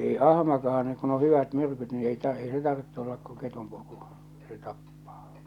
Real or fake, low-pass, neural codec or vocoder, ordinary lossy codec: real; 19.8 kHz; none; none